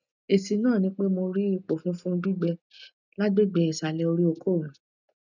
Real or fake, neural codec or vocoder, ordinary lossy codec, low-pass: real; none; none; 7.2 kHz